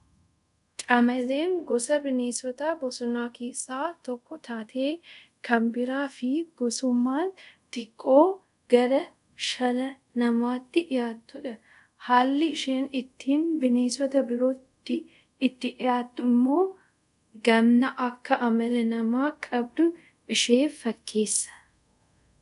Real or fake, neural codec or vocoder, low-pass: fake; codec, 24 kHz, 0.5 kbps, DualCodec; 10.8 kHz